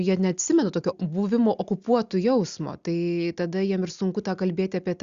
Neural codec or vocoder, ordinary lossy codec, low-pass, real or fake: none; Opus, 64 kbps; 7.2 kHz; real